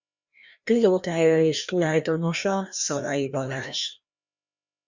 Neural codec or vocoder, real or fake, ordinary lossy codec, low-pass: codec, 16 kHz, 1 kbps, FreqCodec, larger model; fake; Opus, 64 kbps; 7.2 kHz